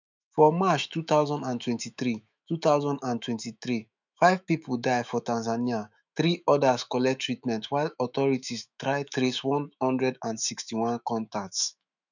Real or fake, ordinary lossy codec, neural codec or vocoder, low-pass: fake; none; autoencoder, 48 kHz, 128 numbers a frame, DAC-VAE, trained on Japanese speech; 7.2 kHz